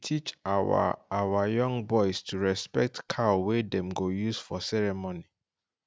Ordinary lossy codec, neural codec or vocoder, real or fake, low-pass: none; none; real; none